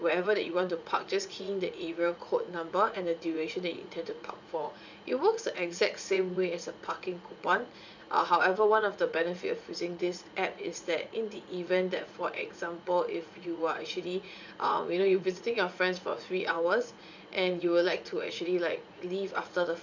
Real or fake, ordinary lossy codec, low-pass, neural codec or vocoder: fake; none; 7.2 kHz; vocoder, 44.1 kHz, 80 mel bands, Vocos